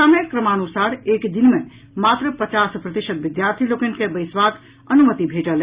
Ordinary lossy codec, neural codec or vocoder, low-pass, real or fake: Opus, 64 kbps; none; 3.6 kHz; real